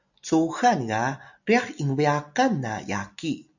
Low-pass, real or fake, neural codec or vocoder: 7.2 kHz; real; none